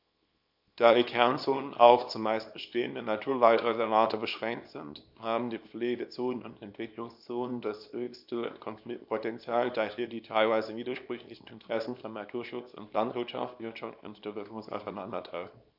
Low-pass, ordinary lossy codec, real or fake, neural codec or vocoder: 5.4 kHz; none; fake; codec, 24 kHz, 0.9 kbps, WavTokenizer, small release